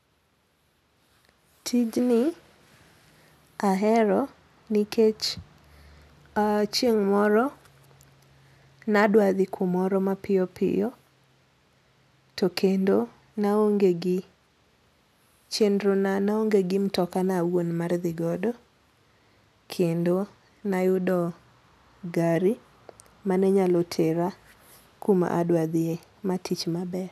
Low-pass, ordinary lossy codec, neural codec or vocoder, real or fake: 14.4 kHz; none; none; real